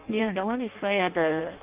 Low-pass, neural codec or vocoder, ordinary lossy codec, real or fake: 3.6 kHz; codec, 16 kHz in and 24 kHz out, 0.6 kbps, FireRedTTS-2 codec; Opus, 32 kbps; fake